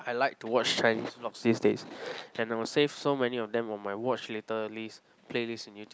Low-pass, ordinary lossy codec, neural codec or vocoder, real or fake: none; none; none; real